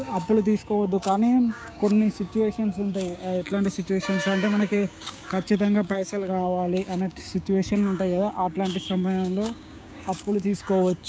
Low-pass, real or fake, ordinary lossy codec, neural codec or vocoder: none; fake; none; codec, 16 kHz, 6 kbps, DAC